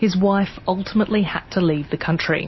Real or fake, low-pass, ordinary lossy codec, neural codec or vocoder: real; 7.2 kHz; MP3, 24 kbps; none